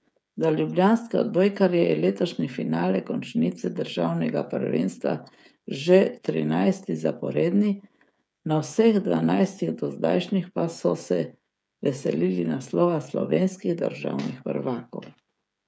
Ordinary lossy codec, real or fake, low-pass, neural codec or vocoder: none; fake; none; codec, 16 kHz, 16 kbps, FreqCodec, smaller model